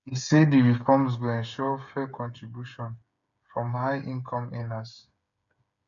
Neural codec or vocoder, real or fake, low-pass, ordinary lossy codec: codec, 16 kHz, 8 kbps, FreqCodec, smaller model; fake; 7.2 kHz; none